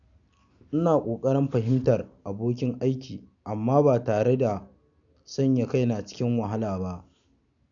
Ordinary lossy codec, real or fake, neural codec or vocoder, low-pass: none; real; none; 7.2 kHz